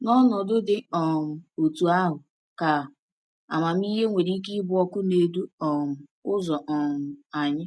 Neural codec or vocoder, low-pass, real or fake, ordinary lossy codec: none; none; real; none